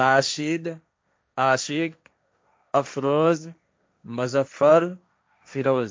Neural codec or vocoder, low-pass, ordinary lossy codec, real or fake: codec, 16 kHz, 1.1 kbps, Voila-Tokenizer; none; none; fake